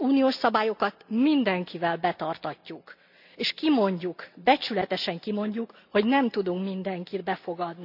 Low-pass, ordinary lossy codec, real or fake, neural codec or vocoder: 5.4 kHz; none; real; none